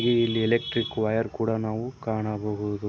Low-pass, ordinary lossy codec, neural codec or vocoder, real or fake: none; none; none; real